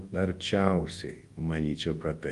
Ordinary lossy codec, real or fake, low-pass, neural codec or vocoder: Opus, 24 kbps; fake; 10.8 kHz; codec, 24 kHz, 0.9 kbps, WavTokenizer, large speech release